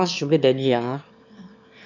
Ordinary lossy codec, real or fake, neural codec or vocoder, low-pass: none; fake; autoencoder, 22.05 kHz, a latent of 192 numbers a frame, VITS, trained on one speaker; 7.2 kHz